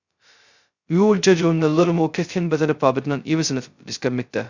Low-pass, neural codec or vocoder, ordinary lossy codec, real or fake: 7.2 kHz; codec, 16 kHz, 0.2 kbps, FocalCodec; Opus, 64 kbps; fake